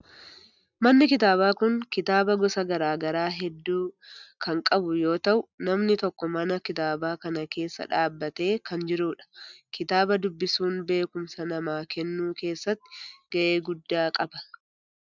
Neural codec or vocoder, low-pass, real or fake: none; 7.2 kHz; real